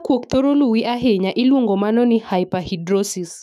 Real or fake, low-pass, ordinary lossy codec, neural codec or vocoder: fake; 14.4 kHz; none; autoencoder, 48 kHz, 128 numbers a frame, DAC-VAE, trained on Japanese speech